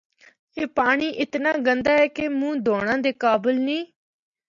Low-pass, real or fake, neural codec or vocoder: 7.2 kHz; real; none